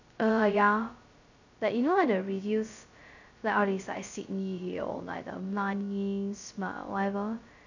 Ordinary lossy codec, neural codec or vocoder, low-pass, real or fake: none; codec, 16 kHz, 0.2 kbps, FocalCodec; 7.2 kHz; fake